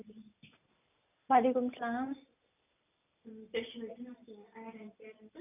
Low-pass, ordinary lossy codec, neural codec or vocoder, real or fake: 3.6 kHz; none; none; real